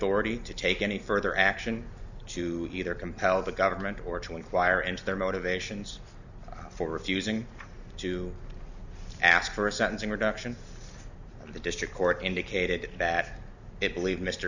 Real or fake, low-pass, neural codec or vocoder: real; 7.2 kHz; none